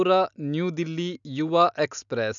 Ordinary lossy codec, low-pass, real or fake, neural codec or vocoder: none; 7.2 kHz; real; none